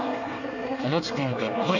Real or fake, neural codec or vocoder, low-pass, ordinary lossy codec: fake; codec, 24 kHz, 1 kbps, SNAC; 7.2 kHz; none